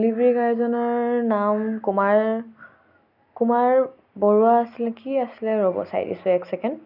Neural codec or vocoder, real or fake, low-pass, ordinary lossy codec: none; real; 5.4 kHz; none